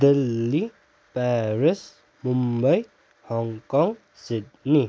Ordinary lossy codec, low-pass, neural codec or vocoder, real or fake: none; none; none; real